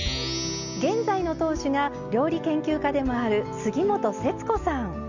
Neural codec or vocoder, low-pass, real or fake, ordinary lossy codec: none; 7.2 kHz; real; Opus, 64 kbps